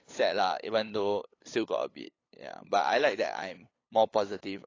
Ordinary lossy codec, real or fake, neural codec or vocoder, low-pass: AAC, 32 kbps; fake; codec, 16 kHz, 8 kbps, FunCodec, trained on LibriTTS, 25 frames a second; 7.2 kHz